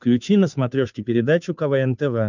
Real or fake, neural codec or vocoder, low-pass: fake; codec, 16 kHz, 2 kbps, FunCodec, trained on Chinese and English, 25 frames a second; 7.2 kHz